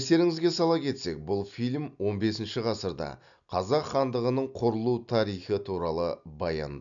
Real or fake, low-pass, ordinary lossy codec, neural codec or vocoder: real; 7.2 kHz; none; none